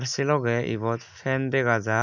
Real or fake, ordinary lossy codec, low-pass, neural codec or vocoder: real; none; 7.2 kHz; none